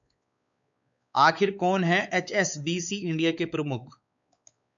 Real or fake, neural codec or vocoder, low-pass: fake; codec, 16 kHz, 4 kbps, X-Codec, WavLM features, trained on Multilingual LibriSpeech; 7.2 kHz